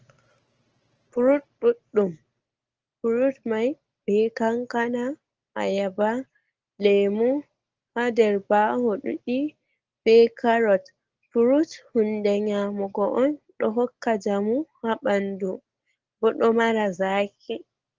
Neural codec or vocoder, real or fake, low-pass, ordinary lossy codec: none; real; 7.2 kHz; Opus, 16 kbps